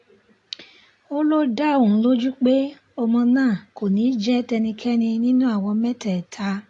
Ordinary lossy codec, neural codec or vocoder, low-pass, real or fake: AAC, 48 kbps; none; 10.8 kHz; real